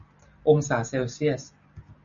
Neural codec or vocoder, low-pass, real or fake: none; 7.2 kHz; real